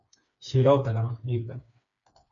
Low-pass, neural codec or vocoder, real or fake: 7.2 kHz; codec, 16 kHz, 4 kbps, FreqCodec, smaller model; fake